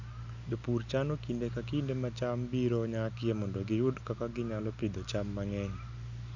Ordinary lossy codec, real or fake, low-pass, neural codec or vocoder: none; real; 7.2 kHz; none